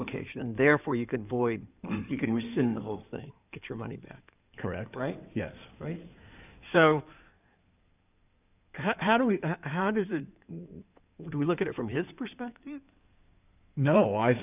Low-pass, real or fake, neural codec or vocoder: 3.6 kHz; fake; codec, 16 kHz in and 24 kHz out, 2.2 kbps, FireRedTTS-2 codec